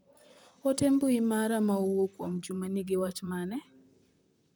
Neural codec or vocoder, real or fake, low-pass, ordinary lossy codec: vocoder, 44.1 kHz, 128 mel bands, Pupu-Vocoder; fake; none; none